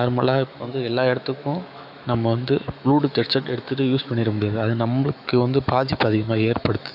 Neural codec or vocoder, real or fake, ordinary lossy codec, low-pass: autoencoder, 48 kHz, 128 numbers a frame, DAC-VAE, trained on Japanese speech; fake; none; 5.4 kHz